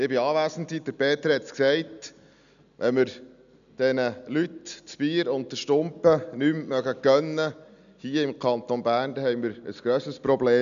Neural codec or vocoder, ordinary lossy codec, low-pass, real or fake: none; none; 7.2 kHz; real